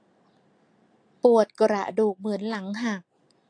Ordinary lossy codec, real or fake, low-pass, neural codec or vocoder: AAC, 48 kbps; real; 9.9 kHz; none